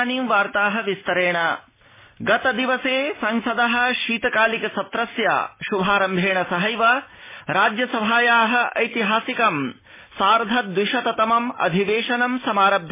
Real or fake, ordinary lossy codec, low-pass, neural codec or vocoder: real; MP3, 16 kbps; 3.6 kHz; none